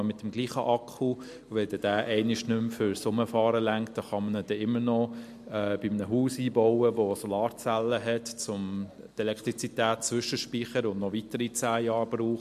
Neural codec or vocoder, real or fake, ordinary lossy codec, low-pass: none; real; MP3, 64 kbps; 14.4 kHz